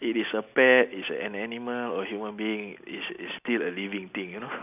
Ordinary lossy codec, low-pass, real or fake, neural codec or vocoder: none; 3.6 kHz; real; none